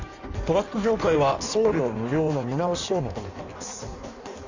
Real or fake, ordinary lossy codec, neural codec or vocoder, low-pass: fake; Opus, 64 kbps; codec, 16 kHz in and 24 kHz out, 1.1 kbps, FireRedTTS-2 codec; 7.2 kHz